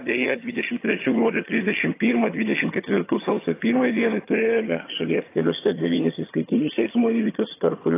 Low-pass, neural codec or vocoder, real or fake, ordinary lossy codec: 3.6 kHz; vocoder, 22.05 kHz, 80 mel bands, HiFi-GAN; fake; AAC, 24 kbps